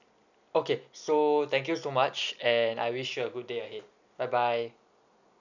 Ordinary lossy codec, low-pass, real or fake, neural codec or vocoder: none; 7.2 kHz; real; none